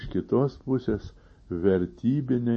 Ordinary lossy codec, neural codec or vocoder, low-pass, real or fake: MP3, 32 kbps; none; 10.8 kHz; real